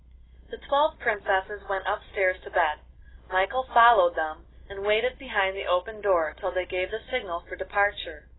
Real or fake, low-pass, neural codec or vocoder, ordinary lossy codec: real; 7.2 kHz; none; AAC, 16 kbps